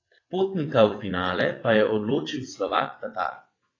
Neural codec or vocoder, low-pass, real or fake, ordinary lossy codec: vocoder, 22.05 kHz, 80 mel bands, WaveNeXt; 7.2 kHz; fake; AAC, 32 kbps